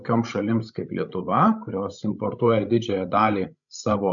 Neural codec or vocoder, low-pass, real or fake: codec, 16 kHz, 16 kbps, FreqCodec, larger model; 7.2 kHz; fake